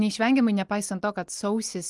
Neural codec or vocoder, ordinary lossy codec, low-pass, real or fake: none; Opus, 24 kbps; 10.8 kHz; real